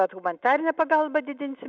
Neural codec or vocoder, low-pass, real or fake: none; 7.2 kHz; real